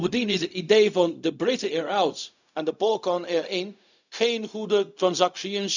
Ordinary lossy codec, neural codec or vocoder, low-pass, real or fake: none; codec, 16 kHz, 0.4 kbps, LongCat-Audio-Codec; 7.2 kHz; fake